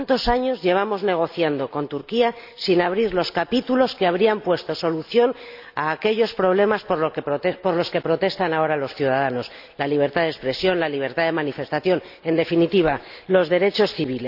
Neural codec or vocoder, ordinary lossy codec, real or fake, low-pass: none; none; real; 5.4 kHz